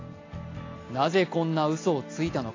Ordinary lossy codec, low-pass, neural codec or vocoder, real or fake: AAC, 32 kbps; 7.2 kHz; none; real